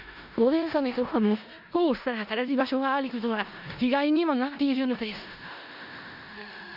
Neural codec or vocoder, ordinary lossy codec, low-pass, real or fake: codec, 16 kHz in and 24 kHz out, 0.4 kbps, LongCat-Audio-Codec, four codebook decoder; none; 5.4 kHz; fake